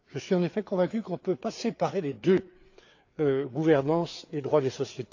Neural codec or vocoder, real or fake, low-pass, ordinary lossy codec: codec, 16 kHz, 4 kbps, FreqCodec, larger model; fake; 7.2 kHz; AAC, 48 kbps